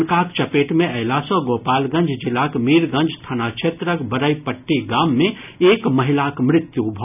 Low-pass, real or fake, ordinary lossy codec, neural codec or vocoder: 3.6 kHz; real; none; none